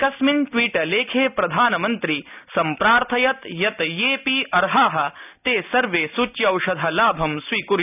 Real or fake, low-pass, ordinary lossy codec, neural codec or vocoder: real; 3.6 kHz; none; none